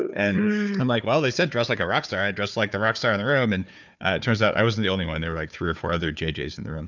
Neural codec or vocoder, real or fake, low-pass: codec, 16 kHz, 4 kbps, FunCodec, trained on Chinese and English, 50 frames a second; fake; 7.2 kHz